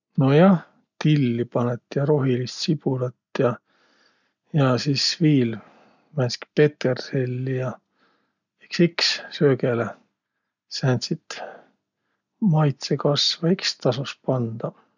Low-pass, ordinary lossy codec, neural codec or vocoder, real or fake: 7.2 kHz; none; none; real